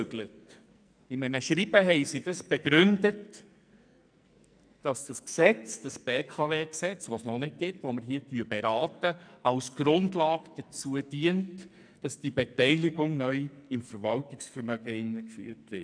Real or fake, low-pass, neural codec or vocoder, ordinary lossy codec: fake; 9.9 kHz; codec, 44.1 kHz, 2.6 kbps, SNAC; none